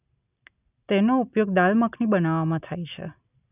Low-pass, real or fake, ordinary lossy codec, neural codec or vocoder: 3.6 kHz; real; none; none